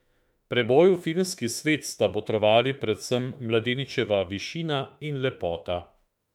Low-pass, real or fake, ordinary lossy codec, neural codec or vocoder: 19.8 kHz; fake; MP3, 96 kbps; autoencoder, 48 kHz, 32 numbers a frame, DAC-VAE, trained on Japanese speech